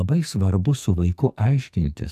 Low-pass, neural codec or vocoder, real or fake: 14.4 kHz; codec, 44.1 kHz, 2.6 kbps, SNAC; fake